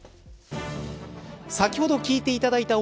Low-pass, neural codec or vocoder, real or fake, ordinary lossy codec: none; none; real; none